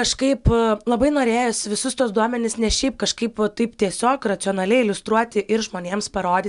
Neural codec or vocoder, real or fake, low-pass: none; real; 10.8 kHz